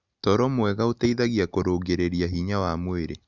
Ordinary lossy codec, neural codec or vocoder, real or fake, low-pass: Opus, 64 kbps; none; real; 7.2 kHz